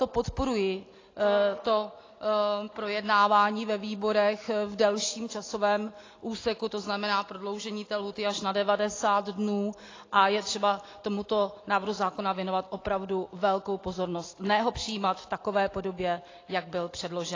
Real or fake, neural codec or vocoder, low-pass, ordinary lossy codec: real; none; 7.2 kHz; AAC, 32 kbps